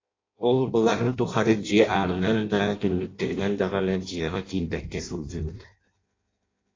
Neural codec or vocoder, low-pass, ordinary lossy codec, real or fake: codec, 16 kHz in and 24 kHz out, 0.6 kbps, FireRedTTS-2 codec; 7.2 kHz; AAC, 32 kbps; fake